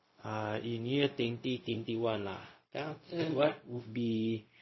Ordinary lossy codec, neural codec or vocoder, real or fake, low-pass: MP3, 24 kbps; codec, 16 kHz, 0.4 kbps, LongCat-Audio-Codec; fake; 7.2 kHz